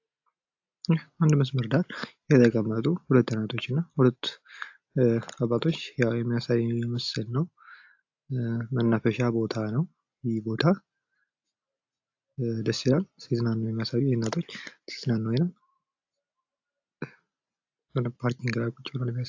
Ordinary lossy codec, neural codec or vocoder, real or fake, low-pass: AAC, 48 kbps; none; real; 7.2 kHz